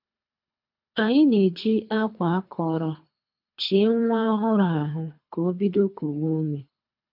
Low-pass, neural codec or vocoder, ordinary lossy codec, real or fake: 5.4 kHz; codec, 24 kHz, 3 kbps, HILCodec; MP3, 48 kbps; fake